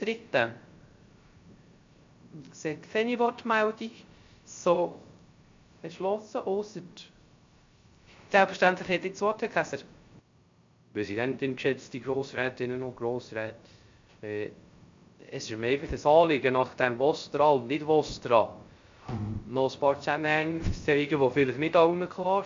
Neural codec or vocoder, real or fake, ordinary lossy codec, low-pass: codec, 16 kHz, 0.3 kbps, FocalCodec; fake; MP3, 48 kbps; 7.2 kHz